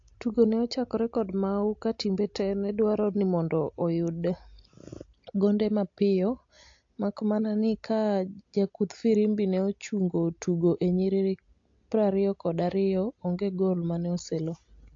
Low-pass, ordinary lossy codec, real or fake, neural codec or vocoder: 7.2 kHz; AAC, 48 kbps; real; none